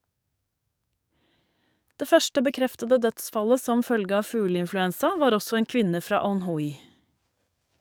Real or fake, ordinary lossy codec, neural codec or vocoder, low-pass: fake; none; codec, 44.1 kHz, 7.8 kbps, DAC; none